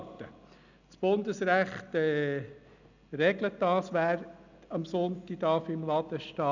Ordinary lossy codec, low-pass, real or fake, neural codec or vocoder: none; 7.2 kHz; real; none